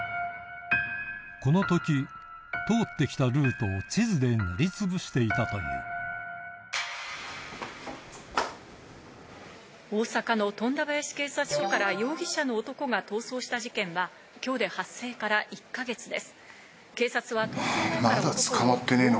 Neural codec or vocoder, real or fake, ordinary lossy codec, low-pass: none; real; none; none